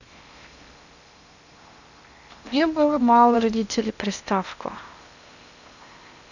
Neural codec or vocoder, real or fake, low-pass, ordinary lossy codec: codec, 16 kHz in and 24 kHz out, 0.8 kbps, FocalCodec, streaming, 65536 codes; fake; 7.2 kHz; none